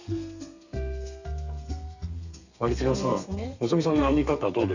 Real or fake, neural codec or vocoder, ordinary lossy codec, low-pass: fake; codec, 32 kHz, 1.9 kbps, SNAC; none; 7.2 kHz